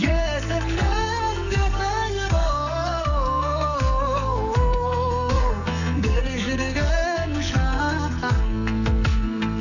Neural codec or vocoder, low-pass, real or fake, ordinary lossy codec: codec, 44.1 kHz, 2.6 kbps, SNAC; 7.2 kHz; fake; none